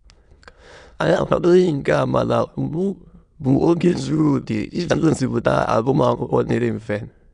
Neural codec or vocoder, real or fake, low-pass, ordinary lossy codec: autoencoder, 22.05 kHz, a latent of 192 numbers a frame, VITS, trained on many speakers; fake; 9.9 kHz; none